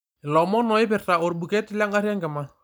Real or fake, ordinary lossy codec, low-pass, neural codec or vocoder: real; none; none; none